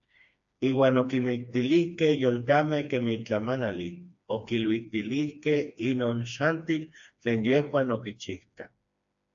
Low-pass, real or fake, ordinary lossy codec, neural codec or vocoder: 7.2 kHz; fake; AAC, 64 kbps; codec, 16 kHz, 2 kbps, FreqCodec, smaller model